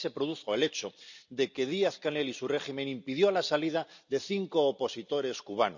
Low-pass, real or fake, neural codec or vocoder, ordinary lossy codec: 7.2 kHz; real; none; none